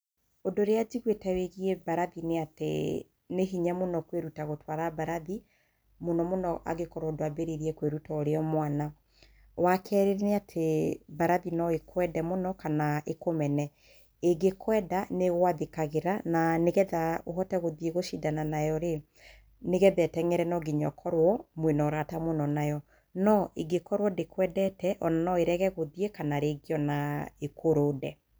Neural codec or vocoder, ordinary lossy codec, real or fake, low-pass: none; none; real; none